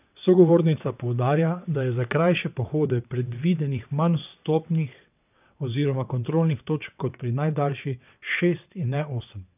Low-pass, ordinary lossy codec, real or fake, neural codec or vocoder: 3.6 kHz; none; fake; vocoder, 22.05 kHz, 80 mel bands, WaveNeXt